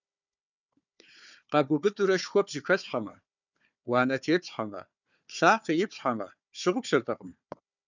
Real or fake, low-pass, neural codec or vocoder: fake; 7.2 kHz; codec, 16 kHz, 4 kbps, FunCodec, trained on Chinese and English, 50 frames a second